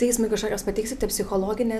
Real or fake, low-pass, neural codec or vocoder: real; 14.4 kHz; none